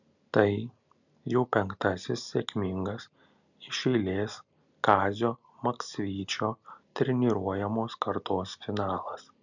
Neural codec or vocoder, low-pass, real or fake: none; 7.2 kHz; real